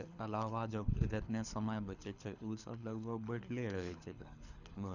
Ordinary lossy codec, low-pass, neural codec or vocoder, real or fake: none; 7.2 kHz; codec, 24 kHz, 6 kbps, HILCodec; fake